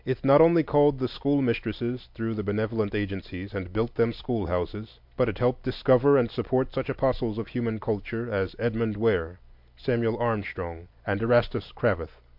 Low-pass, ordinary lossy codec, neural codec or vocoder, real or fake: 5.4 kHz; MP3, 48 kbps; none; real